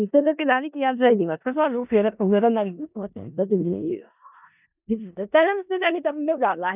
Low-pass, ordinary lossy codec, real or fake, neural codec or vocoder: 3.6 kHz; none; fake; codec, 16 kHz in and 24 kHz out, 0.4 kbps, LongCat-Audio-Codec, four codebook decoder